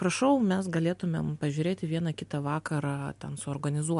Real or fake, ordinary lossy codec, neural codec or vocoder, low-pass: real; MP3, 64 kbps; none; 10.8 kHz